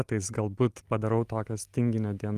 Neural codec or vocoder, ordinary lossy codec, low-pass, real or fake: none; Opus, 24 kbps; 14.4 kHz; real